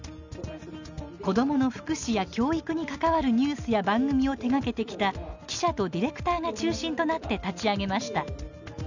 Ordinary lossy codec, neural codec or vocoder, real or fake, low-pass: none; none; real; 7.2 kHz